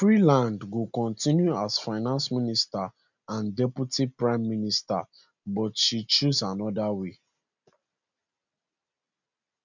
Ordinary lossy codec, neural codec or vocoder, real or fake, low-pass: none; none; real; 7.2 kHz